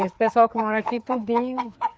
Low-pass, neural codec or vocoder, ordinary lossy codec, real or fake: none; codec, 16 kHz, 4 kbps, FreqCodec, larger model; none; fake